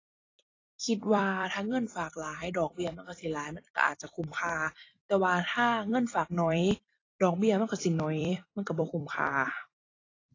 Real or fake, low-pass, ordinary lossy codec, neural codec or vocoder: fake; 7.2 kHz; AAC, 32 kbps; vocoder, 44.1 kHz, 128 mel bands every 256 samples, BigVGAN v2